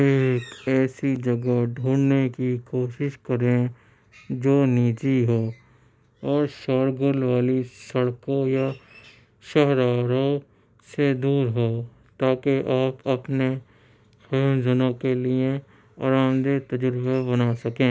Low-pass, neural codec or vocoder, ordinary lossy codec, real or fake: none; none; none; real